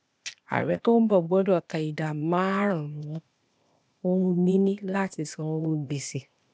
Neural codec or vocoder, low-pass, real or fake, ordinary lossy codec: codec, 16 kHz, 0.8 kbps, ZipCodec; none; fake; none